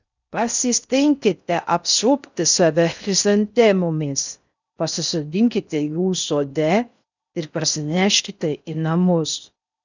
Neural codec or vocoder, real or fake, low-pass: codec, 16 kHz in and 24 kHz out, 0.6 kbps, FocalCodec, streaming, 2048 codes; fake; 7.2 kHz